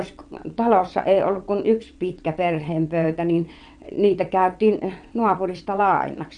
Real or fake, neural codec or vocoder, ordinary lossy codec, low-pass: fake; vocoder, 22.05 kHz, 80 mel bands, WaveNeXt; none; 9.9 kHz